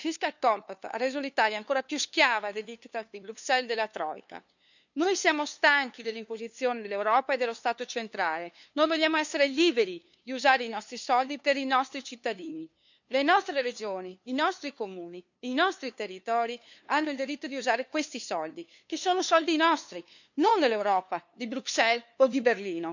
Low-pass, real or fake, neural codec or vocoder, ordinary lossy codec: 7.2 kHz; fake; codec, 16 kHz, 2 kbps, FunCodec, trained on LibriTTS, 25 frames a second; none